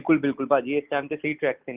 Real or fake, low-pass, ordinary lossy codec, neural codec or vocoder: real; 3.6 kHz; Opus, 32 kbps; none